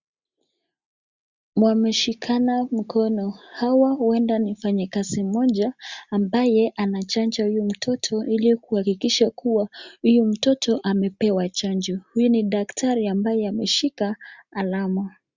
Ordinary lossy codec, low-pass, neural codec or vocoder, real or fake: Opus, 64 kbps; 7.2 kHz; none; real